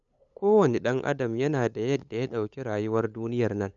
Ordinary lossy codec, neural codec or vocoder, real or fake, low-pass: none; codec, 16 kHz, 8 kbps, FunCodec, trained on LibriTTS, 25 frames a second; fake; 7.2 kHz